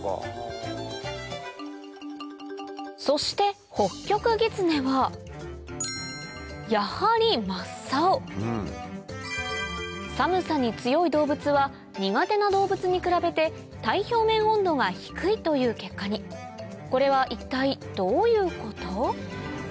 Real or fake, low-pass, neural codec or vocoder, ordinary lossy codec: real; none; none; none